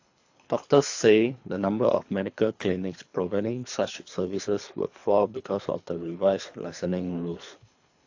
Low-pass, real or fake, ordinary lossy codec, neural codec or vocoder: 7.2 kHz; fake; AAC, 48 kbps; codec, 24 kHz, 3 kbps, HILCodec